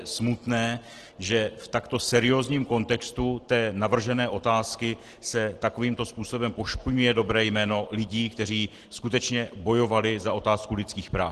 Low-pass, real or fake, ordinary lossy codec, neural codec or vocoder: 10.8 kHz; real; Opus, 16 kbps; none